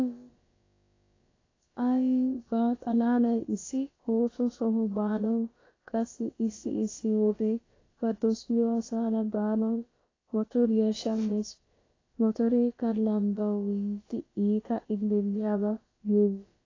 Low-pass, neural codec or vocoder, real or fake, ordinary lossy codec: 7.2 kHz; codec, 16 kHz, about 1 kbps, DyCAST, with the encoder's durations; fake; AAC, 32 kbps